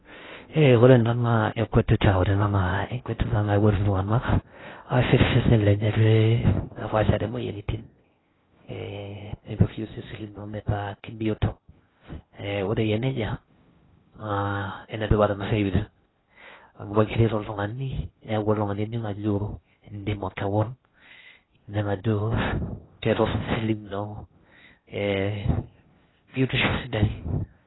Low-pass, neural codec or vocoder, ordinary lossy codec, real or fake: 7.2 kHz; codec, 16 kHz in and 24 kHz out, 0.6 kbps, FocalCodec, streaming, 2048 codes; AAC, 16 kbps; fake